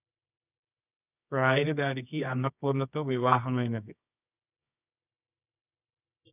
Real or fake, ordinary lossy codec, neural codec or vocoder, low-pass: fake; none; codec, 24 kHz, 0.9 kbps, WavTokenizer, medium music audio release; 3.6 kHz